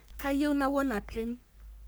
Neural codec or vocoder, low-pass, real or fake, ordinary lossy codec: codec, 44.1 kHz, 3.4 kbps, Pupu-Codec; none; fake; none